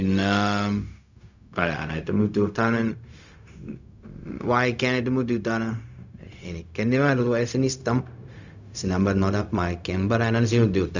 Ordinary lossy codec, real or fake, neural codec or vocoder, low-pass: none; fake; codec, 16 kHz, 0.4 kbps, LongCat-Audio-Codec; 7.2 kHz